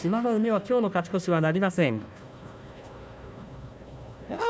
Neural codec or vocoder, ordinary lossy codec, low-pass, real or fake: codec, 16 kHz, 1 kbps, FunCodec, trained on Chinese and English, 50 frames a second; none; none; fake